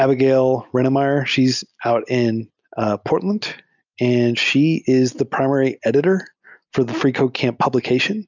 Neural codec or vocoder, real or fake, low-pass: none; real; 7.2 kHz